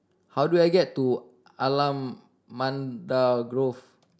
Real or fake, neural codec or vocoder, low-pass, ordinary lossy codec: real; none; none; none